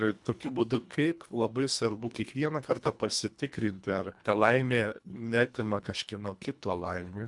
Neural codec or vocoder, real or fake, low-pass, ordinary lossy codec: codec, 24 kHz, 1.5 kbps, HILCodec; fake; 10.8 kHz; MP3, 96 kbps